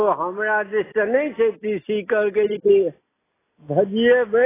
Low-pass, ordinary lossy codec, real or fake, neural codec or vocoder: 3.6 kHz; AAC, 16 kbps; real; none